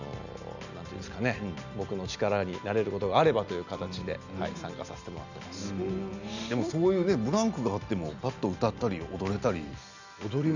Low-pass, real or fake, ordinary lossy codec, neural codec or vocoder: 7.2 kHz; real; none; none